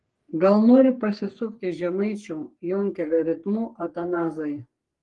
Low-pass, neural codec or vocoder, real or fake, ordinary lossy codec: 10.8 kHz; codec, 44.1 kHz, 3.4 kbps, Pupu-Codec; fake; Opus, 24 kbps